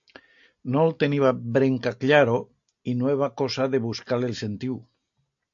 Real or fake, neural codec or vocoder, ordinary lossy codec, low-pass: real; none; AAC, 64 kbps; 7.2 kHz